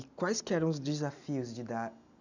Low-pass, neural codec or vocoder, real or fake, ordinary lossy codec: 7.2 kHz; none; real; none